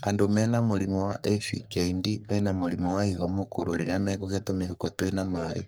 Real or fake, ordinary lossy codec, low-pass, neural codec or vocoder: fake; none; none; codec, 44.1 kHz, 3.4 kbps, Pupu-Codec